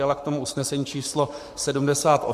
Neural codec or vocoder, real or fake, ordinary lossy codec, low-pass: codec, 44.1 kHz, 7.8 kbps, Pupu-Codec; fake; AAC, 96 kbps; 14.4 kHz